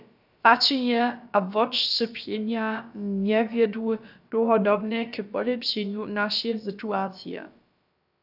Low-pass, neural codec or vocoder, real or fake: 5.4 kHz; codec, 16 kHz, about 1 kbps, DyCAST, with the encoder's durations; fake